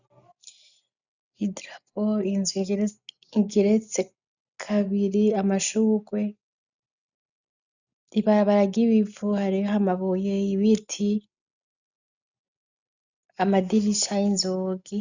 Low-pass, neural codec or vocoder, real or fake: 7.2 kHz; none; real